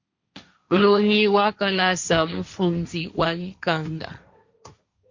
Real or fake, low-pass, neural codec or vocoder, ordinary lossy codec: fake; 7.2 kHz; codec, 16 kHz, 1.1 kbps, Voila-Tokenizer; Opus, 64 kbps